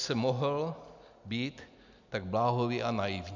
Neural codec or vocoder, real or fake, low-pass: none; real; 7.2 kHz